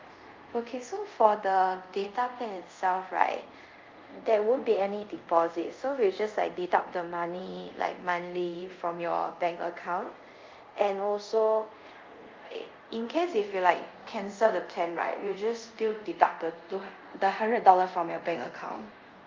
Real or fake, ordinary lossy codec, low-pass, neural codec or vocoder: fake; Opus, 24 kbps; 7.2 kHz; codec, 24 kHz, 0.5 kbps, DualCodec